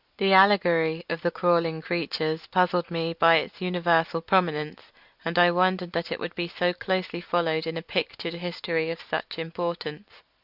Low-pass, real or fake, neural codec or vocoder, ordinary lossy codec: 5.4 kHz; real; none; Opus, 64 kbps